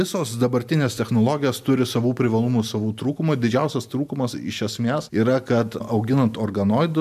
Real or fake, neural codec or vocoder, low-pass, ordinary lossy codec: real; none; 14.4 kHz; MP3, 96 kbps